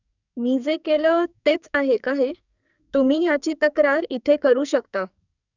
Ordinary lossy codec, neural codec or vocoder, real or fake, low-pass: none; codec, 44.1 kHz, 2.6 kbps, SNAC; fake; 7.2 kHz